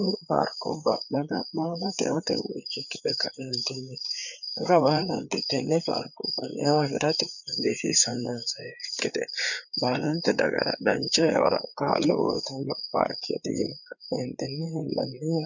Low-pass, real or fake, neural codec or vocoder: 7.2 kHz; fake; codec, 16 kHz, 4 kbps, FreqCodec, larger model